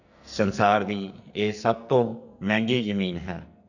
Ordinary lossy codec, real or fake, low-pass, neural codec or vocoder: none; fake; 7.2 kHz; codec, 44.1 kHz, 2.6 kbps, SNAC